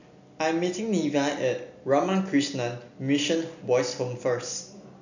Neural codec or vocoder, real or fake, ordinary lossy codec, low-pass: none; real; none; 7.2 kHz